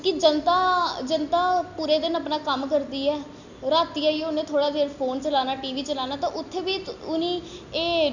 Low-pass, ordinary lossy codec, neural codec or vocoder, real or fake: 7.2 kHz; none; none; real